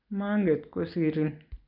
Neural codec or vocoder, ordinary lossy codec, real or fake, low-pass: none; none; real; 5.4 kHz